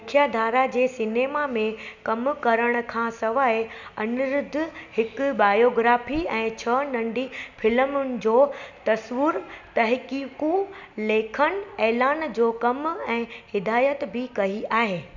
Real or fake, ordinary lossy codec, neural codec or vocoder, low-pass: real; none; none; 7.2 kHz